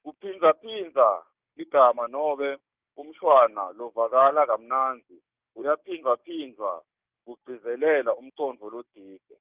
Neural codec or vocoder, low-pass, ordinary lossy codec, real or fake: codec, 16 kHz, 6 kbps, DAC; 3.6 kHz; Opus, 24 kbps; fake